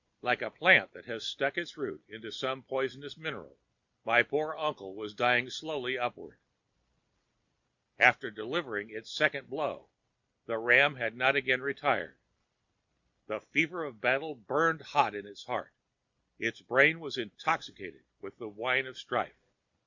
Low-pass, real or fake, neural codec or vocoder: 7.2 kHz; real; none